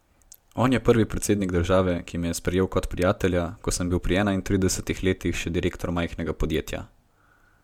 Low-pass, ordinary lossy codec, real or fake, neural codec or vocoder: 19.8 kHz; MP3, 96 kbps; real; none